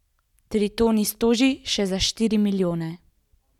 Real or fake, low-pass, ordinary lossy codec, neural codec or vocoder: real; 19.8 kHz; none; none